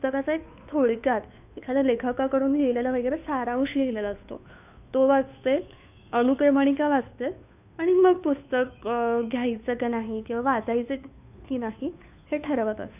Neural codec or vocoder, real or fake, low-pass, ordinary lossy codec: codec, 16 kHz, 2 kbps, FunCodec, trained on Chinese and English, 25 frames a second; fake; 3.6 kHz; none